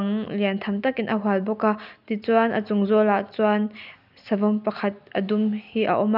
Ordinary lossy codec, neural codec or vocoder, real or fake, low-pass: none; none; real; 5.4 kHz